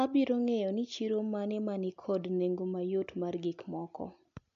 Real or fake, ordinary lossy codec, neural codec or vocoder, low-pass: real; AAC, 64 kbps; none; 7.2 kHz